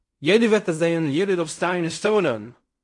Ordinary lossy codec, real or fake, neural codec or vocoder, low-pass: MP3, 48 kbps; fake; codec, 16 kHz in and 24 kHz out, 0.4 kbps, LongCat-Audio-Codec, fine tuned four codebook decoder; 10.8 kHz